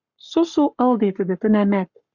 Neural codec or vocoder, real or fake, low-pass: codec, 44.1 kHz, 7.8 kbps, Pupu-Codec; fake; 7.2 kHz